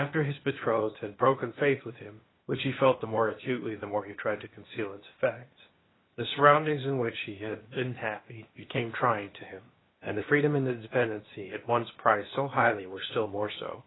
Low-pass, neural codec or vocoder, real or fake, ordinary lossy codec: 7.2 kHz; codec, 16 kHz, 0.8 kbps, ZipCodec; fake; AAC, 16 kbps